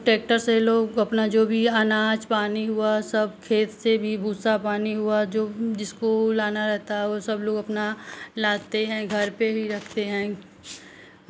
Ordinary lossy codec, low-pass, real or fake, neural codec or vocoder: none; none; real; none